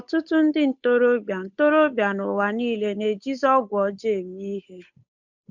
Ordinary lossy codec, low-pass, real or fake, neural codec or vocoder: MP3, 64 kbps; 7.2 kHz; fake; codec, 16 kHz, 8 kbps, FunCodec, trained on Chinese and English, 25 frames a second